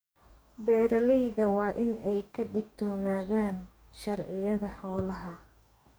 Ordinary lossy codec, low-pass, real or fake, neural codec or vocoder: none; none; fake; codec, 44.1 kHz, 2.6 kbps, DAC